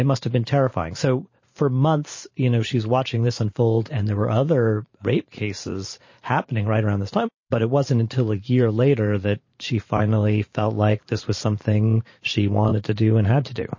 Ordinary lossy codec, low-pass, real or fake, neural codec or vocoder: MP3, 32 kbps; 7.2 kHz; fake; vocoder, 44.1 kHz, 128 mel bands every 512 samples, BigVGAN v2